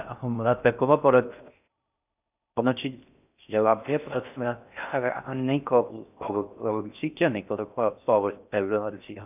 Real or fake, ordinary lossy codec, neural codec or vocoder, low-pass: fake; none; codec, 16 kHz in and 24 kHz out, 0.6 kbps, FocalCodec, streaming, 4096 codes; 3.6 kHz